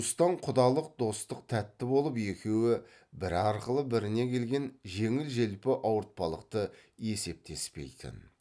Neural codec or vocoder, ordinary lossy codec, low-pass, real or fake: none; none; none; real